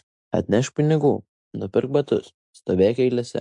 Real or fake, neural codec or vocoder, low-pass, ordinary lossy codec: real; none; 10.8 kHz; MP3, 64 kbps